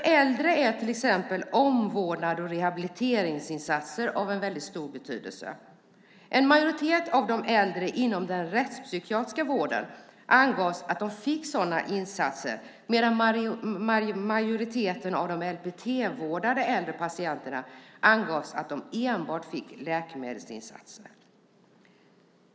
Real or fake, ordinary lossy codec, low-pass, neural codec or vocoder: real; none; none; none